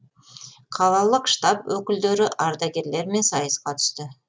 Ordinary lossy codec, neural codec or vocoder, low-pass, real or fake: none; none; none; real